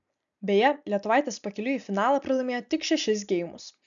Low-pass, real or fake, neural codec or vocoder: 7.2 kHz; real; none